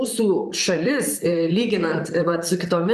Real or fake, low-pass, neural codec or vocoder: fake; 14.4 kHz; vocoder, 44.1 kHz, 128 mel bands, Pupu-Vocoder